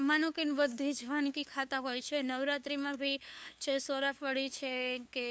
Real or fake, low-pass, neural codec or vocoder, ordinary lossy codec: fake; none; codec, 16 kHz, 2 kbps, FunCodec, trained on LibriTTS, 25 frames a second; none